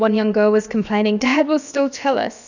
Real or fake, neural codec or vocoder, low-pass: fake; codec, 16 kHz, about 1 kbps, DyCAST, with the encoder's durations; 7.2 kHz